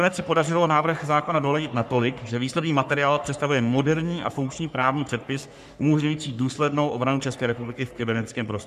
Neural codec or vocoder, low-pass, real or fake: codec, 44.1 kHz, 3.4 kbps, Pupu-Codec; 14.4 kHz; fake